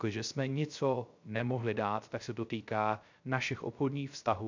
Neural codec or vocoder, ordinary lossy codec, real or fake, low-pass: codec, 16 kHz, 0.3 kbps, FocalCodec; MP3, 64 kbps; fake; 7.2 kHz